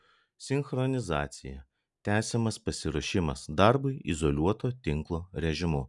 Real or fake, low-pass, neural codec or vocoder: real; 10.8 kHz; none